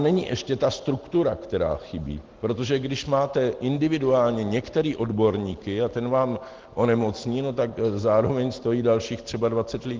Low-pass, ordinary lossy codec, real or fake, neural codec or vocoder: 7.2 kHz; Opus, 16 kbps; real; none